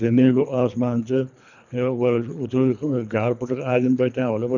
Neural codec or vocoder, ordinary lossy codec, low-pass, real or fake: codec, 24 kHz, 3 kbps, HILCodec; none; 7.2 kHz; fake